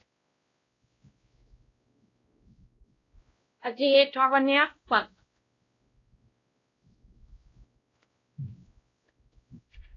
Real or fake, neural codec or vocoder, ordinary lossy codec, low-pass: fake; codec, 16 kHz, 0.5 kbps, X-Codec, WavLM features, trained on Multilingual LibriSpeech; AAC, 32 kbps; 7.2 kHz